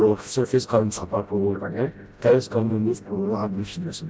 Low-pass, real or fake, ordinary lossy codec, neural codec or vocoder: none; fake; none; codec, 16 kHz, 0.5 kbps, FreqCodec, smaller model